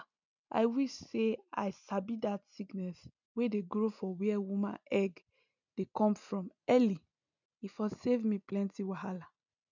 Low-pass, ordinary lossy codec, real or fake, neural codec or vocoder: 7.2 kHz; none; real; none